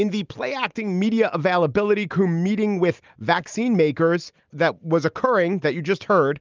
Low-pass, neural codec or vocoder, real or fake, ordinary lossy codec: 7.2 kHz; none; real; Opus, 24 kbps